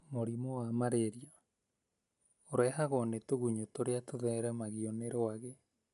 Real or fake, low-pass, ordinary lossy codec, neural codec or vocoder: real; 10.8 kHz; none; none